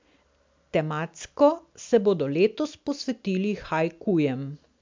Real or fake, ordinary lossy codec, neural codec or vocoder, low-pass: real; MP3, 64 kbps; none; 7.2 kHz